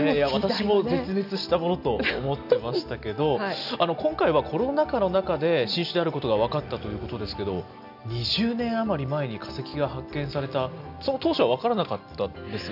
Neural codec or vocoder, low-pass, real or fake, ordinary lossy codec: none; 5.4 kHz; real; none